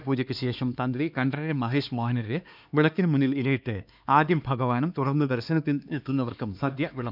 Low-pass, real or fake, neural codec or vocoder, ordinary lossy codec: 5.4 kHz; fake; codec, 16 kHz, 2 kbps, X-Codec, HuBERT features, trained on LibriSpeech; none